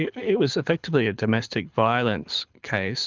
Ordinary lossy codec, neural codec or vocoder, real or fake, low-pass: Opus, 32 kbps; codec, 24 kHz, 6 kbps, HILCodec; fake; 7.2 kHz